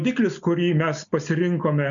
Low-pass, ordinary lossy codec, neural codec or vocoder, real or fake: 7.2 kHz; MP3, 96 kbps; none; real